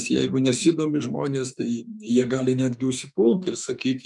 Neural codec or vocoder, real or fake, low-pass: autoencoder, 48 kHz, 32 numbers a frame, DAC-VAE, trained on Japanese speech; fake; 10.8 kHz